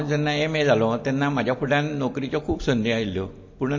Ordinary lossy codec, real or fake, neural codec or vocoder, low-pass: MP3, 32 kbps; real; none; 7.2 kHz